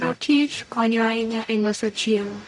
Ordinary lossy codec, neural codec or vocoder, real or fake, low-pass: none; codec, 44.1 kHz, 0.9 kbps, DAC; fake; 10.8 kHz